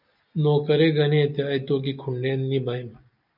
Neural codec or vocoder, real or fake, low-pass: none; real; 5.4 kHz